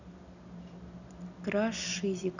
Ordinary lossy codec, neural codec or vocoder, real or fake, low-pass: none; none; real; 7.2 kHz